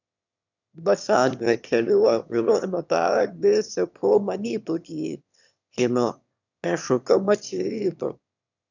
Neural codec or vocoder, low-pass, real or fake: autoencoder, 22.05 kHz, a latent of 192 numbers a frame, VITS, trained on one speaker; 7.2 kHz; fake